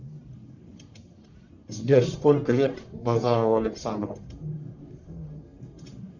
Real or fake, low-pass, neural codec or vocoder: fake; 7.2 kHz; codec, 44.1 kHz, 1.7 kbps, Pupu-Codec